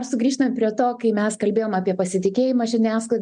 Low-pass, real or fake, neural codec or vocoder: 9.9 kHz; real; none